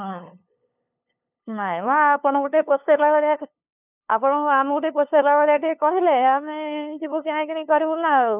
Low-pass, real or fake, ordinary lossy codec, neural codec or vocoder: 3.6 kHz; fake; none; codec, 16 kHz, 2 kbps, FunCodec, trained on LibriTTS, 25 frames a second